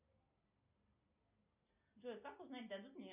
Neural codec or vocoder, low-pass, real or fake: none; 3.6 kHz; real